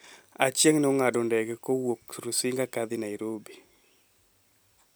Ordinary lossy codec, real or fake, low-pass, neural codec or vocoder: none; real; none; none